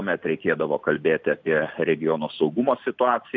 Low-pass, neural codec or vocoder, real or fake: 7.2 kHz; none; real